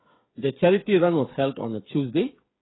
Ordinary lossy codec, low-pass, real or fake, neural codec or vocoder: AAC, 16 kbps; 7.2 kHz; fake; codec, 16 kHz, 8 kbps, FreqCodec, smaller model